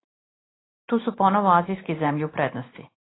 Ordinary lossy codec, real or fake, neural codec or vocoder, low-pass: AAC, 16 kbps; real; none; 7.2 kHz